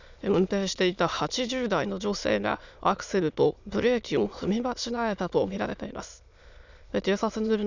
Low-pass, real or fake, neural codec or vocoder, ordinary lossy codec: 7.2 kHz; fake; autoencoder, 22.05 kHz, a latent of 192 numbers a frame, VITS, trained on many speakers; none